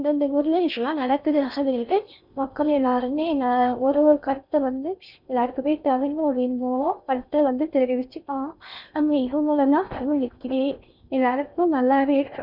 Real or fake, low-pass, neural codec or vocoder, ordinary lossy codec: fake; 5.4 kHz; codec, 16 kHz in and 24 kHz out, 0.6 kbps, FocalCodec, streaming, 4096 codes; none